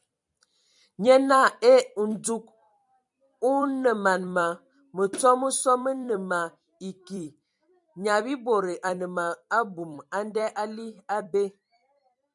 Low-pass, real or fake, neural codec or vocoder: 10.8 kHz; fake; vocoder, 44.1 kHz, 128 mel bands every 256 samples, BigVGAN v2